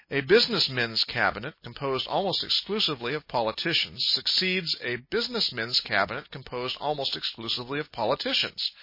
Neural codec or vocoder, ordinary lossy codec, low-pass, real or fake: none; MP3, 24 kbps; 5.4 kHz; real